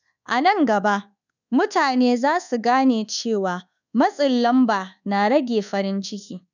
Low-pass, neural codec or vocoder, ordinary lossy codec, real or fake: 7.2 kHz; codec, 24 kHz, 1.2 kbps, DualCodec; none; fake